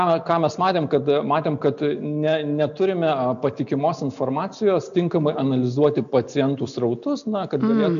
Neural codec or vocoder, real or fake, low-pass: none; real; 7.2 kHz